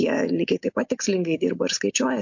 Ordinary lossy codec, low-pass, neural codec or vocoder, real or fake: MP3, 48 kbps; 7.2 kHz; none; real